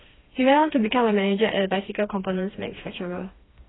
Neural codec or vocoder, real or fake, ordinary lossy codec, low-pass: codec, 16 kHz, 2 kbps, FreqCodec, smaller model; fake; AAC, 16 kbps; 7.2 kHz